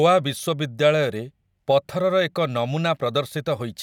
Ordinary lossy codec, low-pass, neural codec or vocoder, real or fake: none; 19.8 kHz; none; real